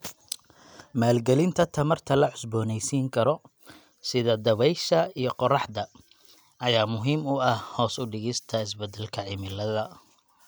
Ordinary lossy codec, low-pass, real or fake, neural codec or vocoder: none; none; real; none